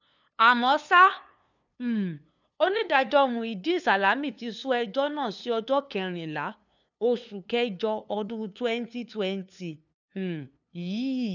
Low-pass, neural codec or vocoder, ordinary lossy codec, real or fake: 7.2 kHz; codec, 16 kHz, 2 kbps, FunCodec, trained on LibriTTS, 25 frames a second; none; fake